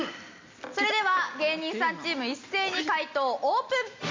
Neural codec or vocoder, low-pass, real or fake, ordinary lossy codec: none; 7.2 kHz; real; MP3, 64 kbps